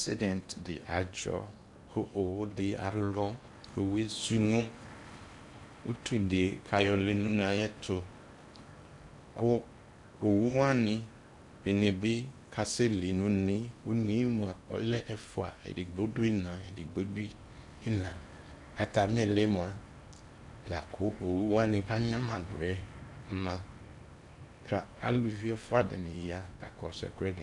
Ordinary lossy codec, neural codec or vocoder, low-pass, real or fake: AAC, 64 kbps; codec, 16 kHz in and 24 kHz out, 0.8 kbps, FocalCodec, streaming, 65536 codes; 10.8 kHz; fake